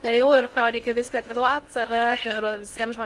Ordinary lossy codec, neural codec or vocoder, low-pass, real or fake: Opus, 32 kbps; codec, 16 kHz in and 24 kHz out, 0.6 kbps, FocalCodec, streaming, 2048 codes; 10.8 kHz; fake